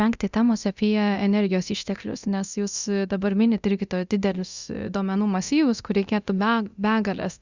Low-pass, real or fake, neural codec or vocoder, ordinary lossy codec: 7.2 kHz; fake; codec, 24 kHz, 0.9 kbps, DualCodec; Opus, 64 kbps